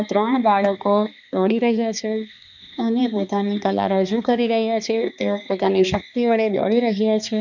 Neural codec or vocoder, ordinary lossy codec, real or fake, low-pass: codec, 16 kHz, 2 kbps, X-Codec, HuBERT features, trained on balanced general audio; none; fake; 7.2 kHz